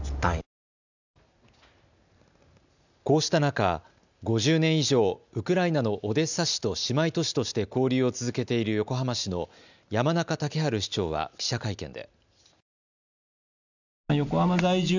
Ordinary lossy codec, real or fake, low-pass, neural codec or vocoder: none; real; 7.2 kHz; none